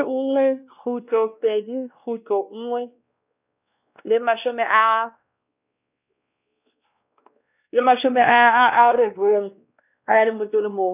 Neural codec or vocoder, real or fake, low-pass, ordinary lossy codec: codec, 16 kHz, 1 kbps, X-Codec, WavLM features, trained on Multilingual LibriSpeech; fake; 3.6 kHz; none